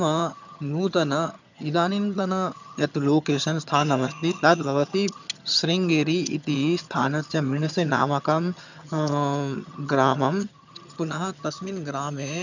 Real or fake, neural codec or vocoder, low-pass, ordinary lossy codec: fake; vocoder, 22.05 kHz, 80 mel bands, HiFi-GAN; 7.2 kHz; none